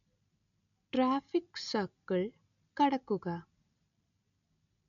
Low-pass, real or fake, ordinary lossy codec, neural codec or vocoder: 7.2 kHz; real; none; none